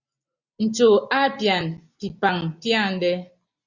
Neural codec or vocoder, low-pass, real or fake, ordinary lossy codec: none; 7.2 kHz; real; Opus, 64 kbps